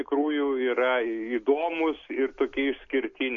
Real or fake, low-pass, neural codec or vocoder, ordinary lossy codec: real; 7.2 kHz; none; MP3, 48 kbps